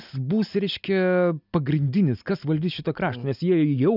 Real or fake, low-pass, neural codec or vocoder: real; 5.4 kHz; none